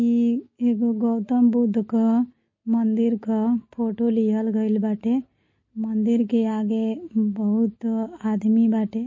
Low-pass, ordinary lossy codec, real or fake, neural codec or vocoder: 7.2 kHz; MP3, 32 kbps; real; none